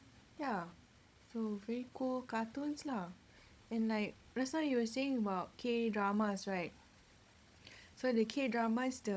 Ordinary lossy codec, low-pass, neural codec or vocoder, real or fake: none; none; codec, 16 kHz, 16 kbps, FunCodec, trained on Chinese and English, 50 frames a second; fake